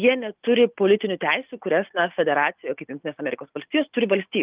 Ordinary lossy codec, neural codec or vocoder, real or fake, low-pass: Opus, 32 kbps; none; real; 3.6 kHz